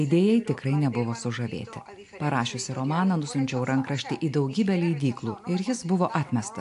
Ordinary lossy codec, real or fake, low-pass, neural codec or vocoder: AAC, 64 kbps; real; 10.8 kHz; none